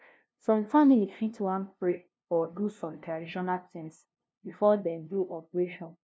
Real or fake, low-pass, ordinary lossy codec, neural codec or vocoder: fake; none; none; codec, 16 kHz, 0.5 kbps, FunCodec, trained on LibriTTS, 25 frames a second